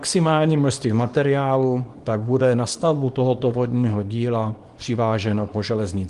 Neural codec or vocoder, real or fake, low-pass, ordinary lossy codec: codec, 24 kHz, 0.9 kbps, WavTokenizer, small release; fake; 10.8 kHz; Opus, 24 kbps